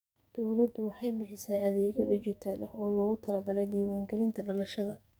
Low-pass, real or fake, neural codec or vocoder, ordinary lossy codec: none; fake; codec, 44.1 kHz, 2.6 kbps, SNAC; none